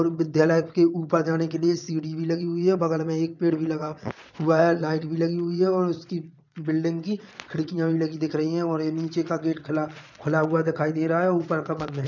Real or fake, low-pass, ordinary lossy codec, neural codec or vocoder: fake; 7.2 kHz; none; vocoder, 22.05 kHz, 80 mel bands, Vocos